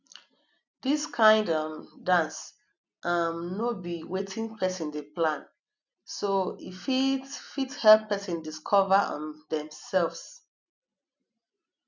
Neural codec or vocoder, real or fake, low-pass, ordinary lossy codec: none; real; 7.2 kHz; none